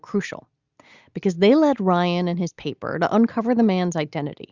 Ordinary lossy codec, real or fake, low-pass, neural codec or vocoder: Opus, 64 kbps; real; 7.2 kHz; none